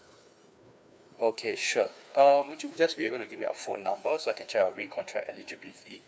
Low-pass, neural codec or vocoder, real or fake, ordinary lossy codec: none; codec, 16 kHz, 2 kbps, FreqCodec, larger model; fake; none